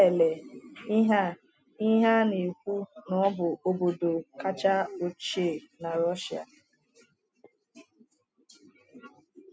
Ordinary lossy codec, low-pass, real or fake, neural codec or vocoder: none; none; real; none